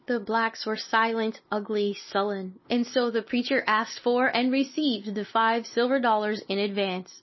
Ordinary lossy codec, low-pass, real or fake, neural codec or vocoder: MP3, 24 kbps; 7.2 kHz; real; none